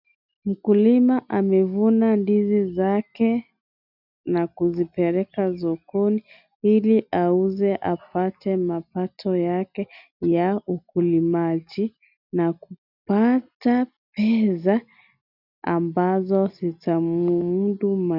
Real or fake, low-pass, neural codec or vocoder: real; 5.4 kHz; none